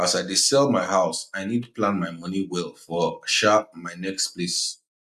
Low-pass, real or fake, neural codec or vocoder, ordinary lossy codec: 14.4 kHz; real; none; none